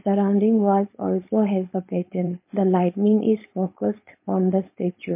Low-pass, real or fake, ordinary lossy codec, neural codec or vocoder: 3.6 kHz; fake; MP3, 24 kbps; codec, 16 kHz, 4.8 kbps, FACodec